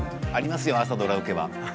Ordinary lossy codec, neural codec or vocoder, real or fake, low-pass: none; none; real; none